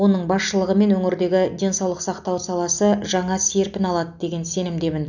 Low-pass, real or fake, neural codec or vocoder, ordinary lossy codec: 7.2 kHz; real; none; none